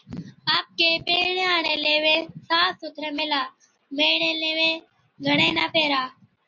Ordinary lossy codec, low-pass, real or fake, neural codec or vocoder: MP3, 48 kbps; 7.2 kHz; real; none